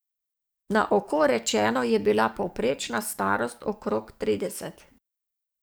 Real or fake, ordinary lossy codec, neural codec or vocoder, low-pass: fake; none; codec, 44.1 kHz, 7.8 kbps, DAC; none